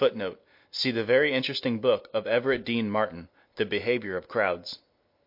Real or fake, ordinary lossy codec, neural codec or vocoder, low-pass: real; MP3, 32 kbps; none; 5.4 kHz